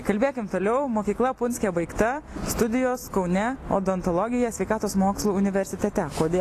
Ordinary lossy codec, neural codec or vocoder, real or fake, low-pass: AAC, 48 kbps; none; real; 14.4 kHz